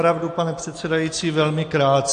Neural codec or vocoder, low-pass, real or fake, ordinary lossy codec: none; 9.9 kHz; real; AAC, 48 kbps